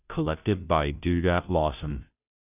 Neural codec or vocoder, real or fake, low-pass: codec, 16 kHz, 0.5 kbps, FunCodec, trained on Chinese and English, 25 frames a second; fake; 3.6 kHz